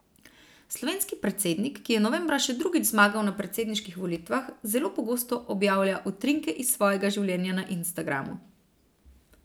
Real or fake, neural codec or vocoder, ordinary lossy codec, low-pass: real; none; none; none